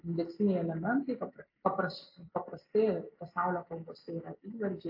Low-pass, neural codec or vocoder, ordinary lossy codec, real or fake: 5.4 kHz; none; AAC, 48 kbps; real